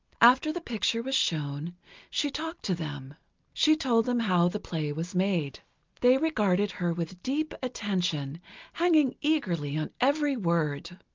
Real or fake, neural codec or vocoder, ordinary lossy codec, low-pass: real; none; Opus, 24 kbps; 7.2 kHz